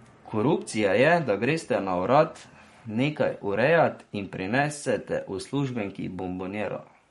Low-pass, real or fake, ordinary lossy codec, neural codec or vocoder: 19.8 kHz; fake; MP3, 48 kbps; codec, 44.1 kHz, 7.8 kbps, Pupu-Codec